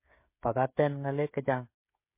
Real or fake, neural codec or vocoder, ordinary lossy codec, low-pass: fake; codec, 16 kHz, 16 kbps, FreqCodec, smaller model; MP3, 24 kbps; 3.6 kHz